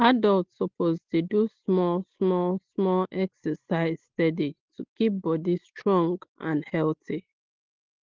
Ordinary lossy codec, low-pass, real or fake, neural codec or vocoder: Opus, 16 kbps; 7.2 kHz; real; none